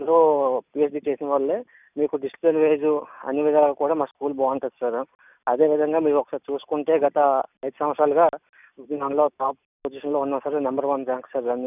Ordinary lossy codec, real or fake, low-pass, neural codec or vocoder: none; real; 3.6 kHz; none